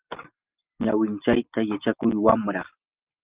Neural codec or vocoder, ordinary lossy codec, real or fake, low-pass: none; Opus, 32 kbps; real; 3.6 kHz